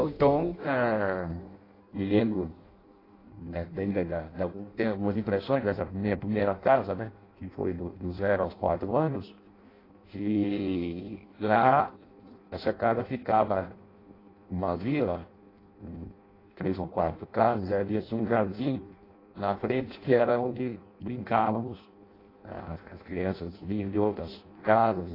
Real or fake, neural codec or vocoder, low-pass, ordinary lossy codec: fake; codec, 16 kHz in and 24 kHz out, 0.6 kbps, FireRedTTS-2 codec; 5.4 kHz; AAC, 24 kbps